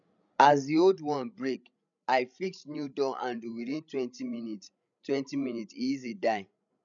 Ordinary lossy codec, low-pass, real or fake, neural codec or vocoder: none; 7.2 kHz; fake; codec, 16 kHz, 16 kbps, FreqCodec, larger model